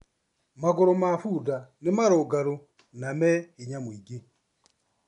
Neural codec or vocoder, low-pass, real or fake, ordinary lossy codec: none; 10.8 kHz; real; MP3, 96 kbps